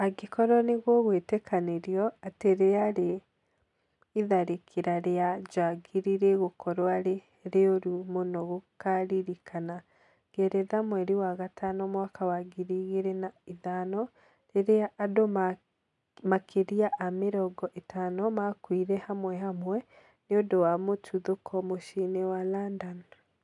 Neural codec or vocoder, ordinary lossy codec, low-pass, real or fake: none; none; 10.8 kHz; real